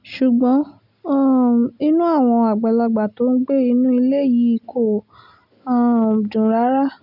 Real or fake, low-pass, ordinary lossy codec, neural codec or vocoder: real; 5.4 kHz; none; none